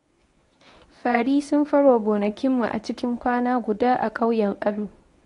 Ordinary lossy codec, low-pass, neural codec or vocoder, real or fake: AAC, 48 kbps; 10.8 kHz; codec, 24 kHz, 0.9 kbps, WavTokenizer, medium speech release version 1; fake